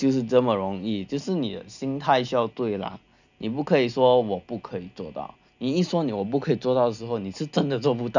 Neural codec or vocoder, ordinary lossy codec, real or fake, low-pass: none; none; real; 7.2 kHz